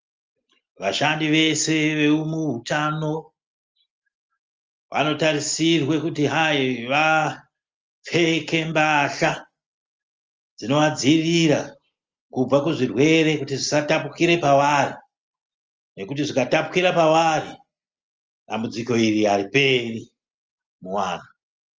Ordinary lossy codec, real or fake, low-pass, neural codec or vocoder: Opus, 24 kbps; real; 7.2 kHz; none